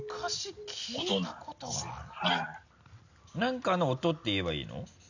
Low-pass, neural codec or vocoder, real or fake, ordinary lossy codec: 7.2 kHz; none; real; none